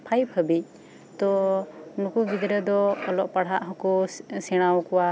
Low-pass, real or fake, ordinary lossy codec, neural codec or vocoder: none; real; none; none